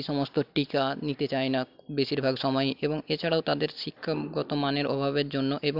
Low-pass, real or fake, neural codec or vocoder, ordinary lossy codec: 5.4 kHz; real; none; MP3, 48 kbps